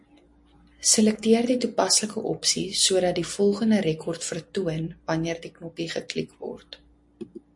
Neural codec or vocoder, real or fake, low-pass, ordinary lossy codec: none; real; 10.8 kHz; MP3, 48 kbps